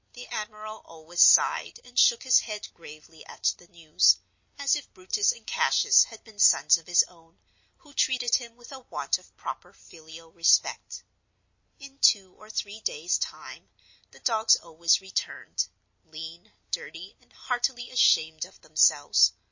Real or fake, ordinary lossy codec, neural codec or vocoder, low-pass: real; MP3, 32 kbps; none; 7.2 kHz